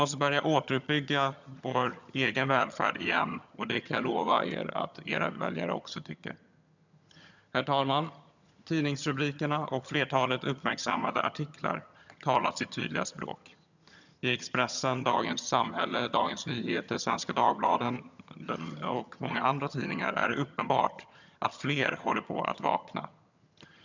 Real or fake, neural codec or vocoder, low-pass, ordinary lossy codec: fake; vocoder, 22.05 kHz, 80 mel bands, HiFi-GAN; 7.2 kHz; none